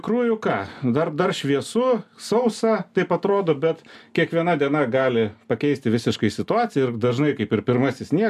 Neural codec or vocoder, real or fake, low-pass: none; real; 14.4 kHz